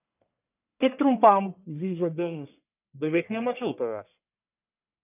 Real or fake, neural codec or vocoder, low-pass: fake; codec, 44.1 kHz, 1.7 kbps, Pupu-Codec; 3.6 kHz